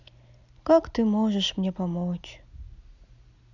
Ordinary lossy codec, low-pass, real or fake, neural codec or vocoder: none; 7.2 kHz; real; none